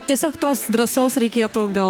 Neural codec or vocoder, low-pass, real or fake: codec, 44.1 kHz, 2.6 kbps, DAC; 19.8 kHz; fake